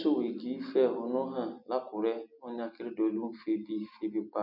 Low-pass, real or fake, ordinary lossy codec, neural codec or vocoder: 5.4 kHz; real; none; none